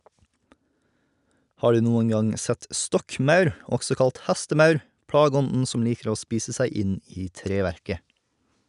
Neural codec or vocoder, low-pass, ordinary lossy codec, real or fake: none; 10.8 kHz; none; real